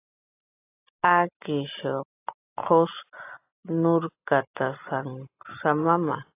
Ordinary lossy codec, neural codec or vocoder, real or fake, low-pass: AAC, 24 kbps; none; real; 3.6 kHz